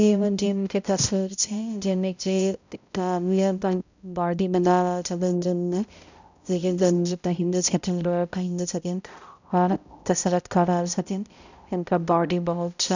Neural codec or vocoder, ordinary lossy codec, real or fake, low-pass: codec, 16 kHz, 0.5 kbps, X-Codec, HuBERT features, trained on balanced general audio; none; fake; 7.2 kHz